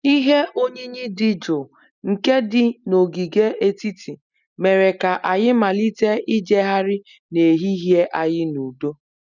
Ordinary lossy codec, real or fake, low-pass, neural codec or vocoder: none; real; 7.2 kHz; none